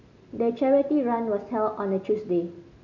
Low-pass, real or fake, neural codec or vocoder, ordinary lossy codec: 7.2 kHz; real; none; none